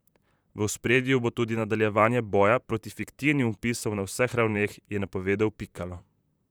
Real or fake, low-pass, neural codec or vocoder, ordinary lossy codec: fake; none; vocoder, 44.1 kHz, 128 mel bands, Pupu-Vocoder; none